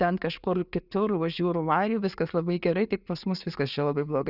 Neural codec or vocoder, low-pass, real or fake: none; 5.4 kHz; real